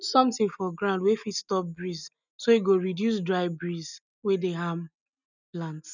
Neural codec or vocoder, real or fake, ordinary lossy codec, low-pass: none; real; none; 7.2 kHz